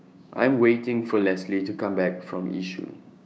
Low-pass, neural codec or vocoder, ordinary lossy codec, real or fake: none; codec, 16 kHz, 6 kbps, DAC; none; fake